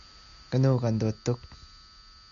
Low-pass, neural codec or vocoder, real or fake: 7.2 kHz; none; real